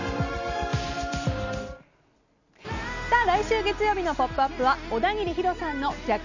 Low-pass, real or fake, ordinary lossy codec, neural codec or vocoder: 7.2 kHz; real; none; none